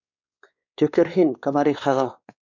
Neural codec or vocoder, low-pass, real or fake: codec, 16 kHz, 2 kbps, X-Codec, WavLM features, trained on Multilingual LibriSpeech; 7.2 kHz; fake